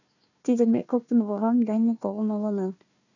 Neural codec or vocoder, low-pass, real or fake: codec, 16 kHz, 1 kbps, FunCodec, trained on Chinese and English, 50 frames a second; 7.2 kHz; fake